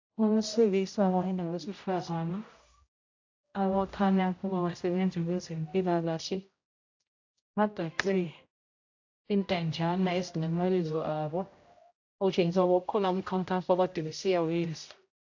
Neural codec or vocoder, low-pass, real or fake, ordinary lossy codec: codec, 16 kHz, 0.5 kbps, X-Codec, HuBERT features, trained on general audio; 7.2 kHz; fake; AAC, 48 kbps